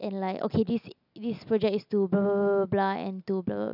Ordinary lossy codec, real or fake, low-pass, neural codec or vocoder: none; real; 5.4 kHz; none